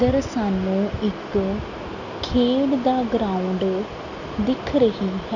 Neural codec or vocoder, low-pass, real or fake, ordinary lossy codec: none; 7.2 kHz; real; none